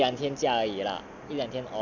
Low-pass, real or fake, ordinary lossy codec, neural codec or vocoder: 7.2 kHz; real; none; none